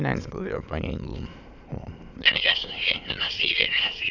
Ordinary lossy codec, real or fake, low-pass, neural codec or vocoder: none; fake; 7.2 kHz; autoencoder, 22.05 kHz, a latent of 192 numbers a frame, VITS, trained on many speakers